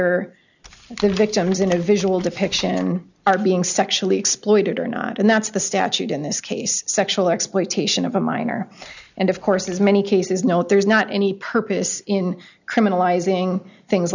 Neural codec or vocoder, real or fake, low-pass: none; real; 7.2 kHz